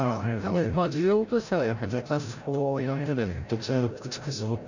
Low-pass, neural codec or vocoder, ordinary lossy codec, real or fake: 7.2 kHz; codec, 16 kHz, 0.5 kbps, FreqCodec, larger model; none; fake